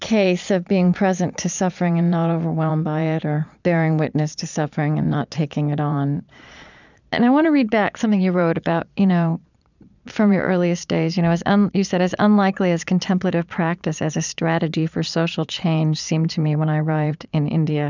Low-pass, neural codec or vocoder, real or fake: 7.2 kHz; vocoder, 22.05 kHz, 80 mel bands, Vocos; fake